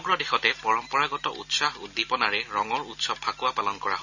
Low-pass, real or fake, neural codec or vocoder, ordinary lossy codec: 7.2 kHz; real; none; none